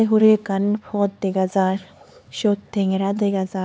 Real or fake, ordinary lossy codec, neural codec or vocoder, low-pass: fake; none; codec, 16 kHz, 4 kbps, X-Codec, HuBERT features, trained on LibriSpeech; none